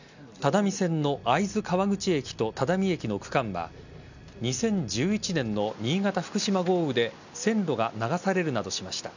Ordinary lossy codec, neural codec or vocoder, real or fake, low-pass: none; none; real; 7.2 kHz